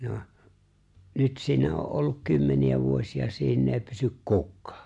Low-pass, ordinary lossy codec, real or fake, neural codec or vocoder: 10.8 kHz; AAC, 64 kbps; real; none